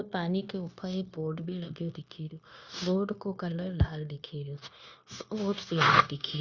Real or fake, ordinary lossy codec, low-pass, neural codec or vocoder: fake; Opus, 64 kbps; 7.2 kHz; codec, 16 kHz, 0.9 kbps, LongCat-Audio-Codec